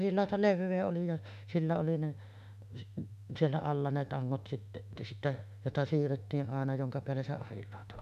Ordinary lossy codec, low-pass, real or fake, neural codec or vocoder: none; 14.4 kHz; fake; autoencoder, 48 kHz, 32 numbers a frame, DAC-VAE, trained on Japanese speech